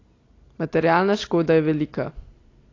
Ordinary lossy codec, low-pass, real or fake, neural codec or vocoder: AAC, 32 kbps; 7.2 kHz; real; none